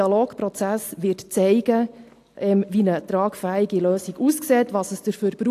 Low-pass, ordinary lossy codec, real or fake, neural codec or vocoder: 14.4 kHz; AAC, 64 kbps; real; none